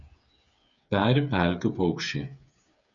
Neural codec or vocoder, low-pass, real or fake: codec, 16 kHz, 8 kbps, FreqCodec, smaller model; 7.2 kHz; fake